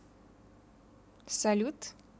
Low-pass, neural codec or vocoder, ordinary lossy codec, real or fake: none; none; none; real